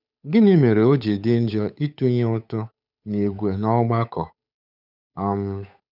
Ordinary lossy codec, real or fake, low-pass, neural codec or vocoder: none; fake; 5.4 kHz; codec, 16 kHz, 8 kbps, FunCodec, trained on Chinese and English, 25 frames a second